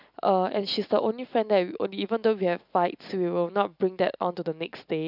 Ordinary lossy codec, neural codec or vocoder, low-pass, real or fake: none; none; 5.4 kHz; real